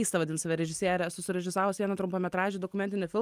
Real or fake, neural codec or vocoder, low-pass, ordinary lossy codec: real; none; 14.4 kHz; Opus, 32 kbps